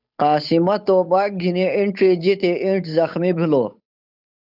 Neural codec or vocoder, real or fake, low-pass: codec, 16 kHz, 8 kbps, FunCodec, trained on Chinese and English, 25 frames a second; fake; 5.4 kHz